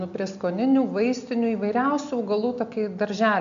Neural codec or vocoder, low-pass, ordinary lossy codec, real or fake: none; 7.2 kHz; MP3, 64 kbps; real